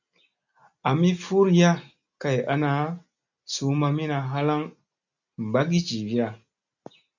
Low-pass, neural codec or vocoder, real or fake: 7.2 kHz; none; real